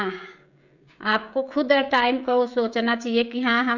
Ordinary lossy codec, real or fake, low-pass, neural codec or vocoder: none; fake; 7.2 kHz; codec, 16 kHz, 16 kbps, FreqCodec, smaller model